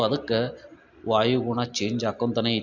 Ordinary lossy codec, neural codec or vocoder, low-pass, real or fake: none; none; 7.2 kHz; real